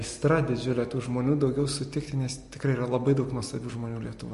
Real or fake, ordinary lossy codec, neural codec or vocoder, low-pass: real; MP3, 48 kbps; none; 14.4 kHz